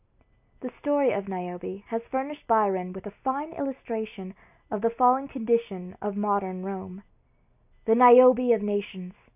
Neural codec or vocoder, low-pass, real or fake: none; 3.6 kHz; real